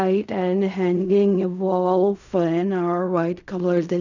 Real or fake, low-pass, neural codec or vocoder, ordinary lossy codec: fake; 7.2 kHz; codec, 16 kHz in and 24 kHz out, 0.4 kbps, LongCat-Audio-Codec, fine tuned four codebook decoder; none